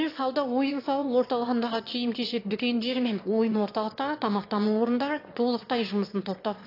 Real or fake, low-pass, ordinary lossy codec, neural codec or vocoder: fake; 5.4 kHz; AAC, 32 kbps; autoencoder, 22.05 kHz, a latent of 192 numbers a frame, VITS, trained on one speaker